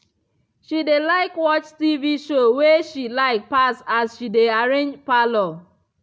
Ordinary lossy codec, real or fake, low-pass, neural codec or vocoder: none; real; none; none